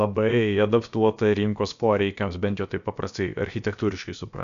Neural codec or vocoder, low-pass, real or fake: codec, 16 kHz, about 1 kbps, DyCAST, with the encoder's durations; 7.2 kHz; fake